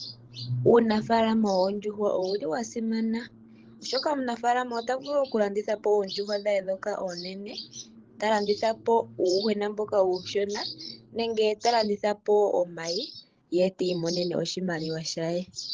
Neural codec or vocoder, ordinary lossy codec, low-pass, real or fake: codec, 16 kHz, 16 kbps, FreqCodec, larger model; Opus, 16 kbps; 7.2 kHz; fake